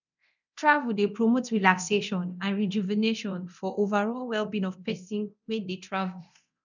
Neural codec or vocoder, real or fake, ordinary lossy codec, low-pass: codec, 24 kHz, 0.9 kbps, DualCodec; fake; none; 7.2 kHz